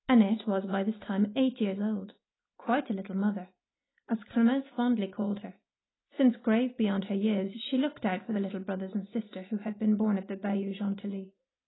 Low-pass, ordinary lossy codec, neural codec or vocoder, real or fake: 7.2 kHz; AAC, 16 kbps; none; real